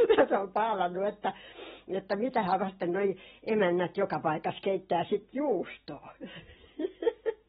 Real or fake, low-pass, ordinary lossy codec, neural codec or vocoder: real; 19.8 kHz; AAC, 16 kbps; none